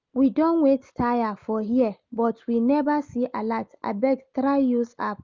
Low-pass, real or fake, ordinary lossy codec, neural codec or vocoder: 7.2 kHz; real; Opus, 24 kbps; none